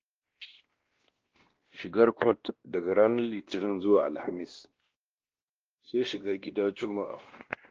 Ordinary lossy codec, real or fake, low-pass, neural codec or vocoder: Opus, 16 kbps; fake; 7.2 kHz; codec, 16 kHz, 1 kbps, X-Codec, WavLM features, trained on Multilingual LibriSpeech